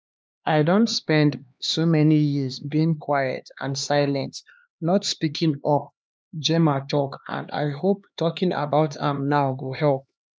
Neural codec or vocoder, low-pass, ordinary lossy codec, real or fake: codec, 16 kHz, 2 kbps, X-Codec, HuBERT features, trained on LibriSpeech; none; none; fake